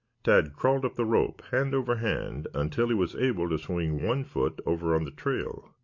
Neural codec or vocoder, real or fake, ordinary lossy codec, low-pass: none; real; MP3, 48 kbps; 7.2 kHz